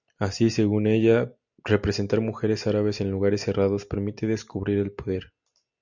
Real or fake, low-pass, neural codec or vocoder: real; 7.2 kHz; none